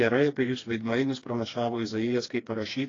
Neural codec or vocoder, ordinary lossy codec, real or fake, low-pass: codec, 16 kHz, 2 kbps, FreqCodec, smaller model; AAC, 32 kbps; fake; 7.2 kHz